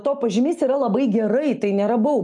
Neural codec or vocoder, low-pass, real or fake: none; 10.8 kHz; real